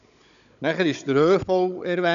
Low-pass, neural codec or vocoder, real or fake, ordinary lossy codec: 7.2 kHz; codec, 16 kHz, 16 kbps, FunCodec, trained on Chinese and English, 50 frames a second; fake; none